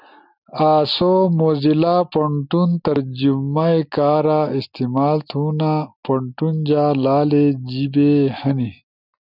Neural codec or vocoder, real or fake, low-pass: none; real; 5.4 kHz